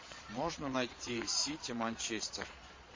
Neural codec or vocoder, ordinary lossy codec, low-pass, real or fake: vocoder, 22.05 kHz, 80 mel bands, Vocos; MP3, 32 kbps; 7.2 kHz; fake